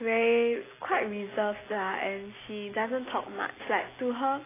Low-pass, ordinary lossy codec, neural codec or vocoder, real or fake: 3.6 kHz; AAC, 16 kbps; none; real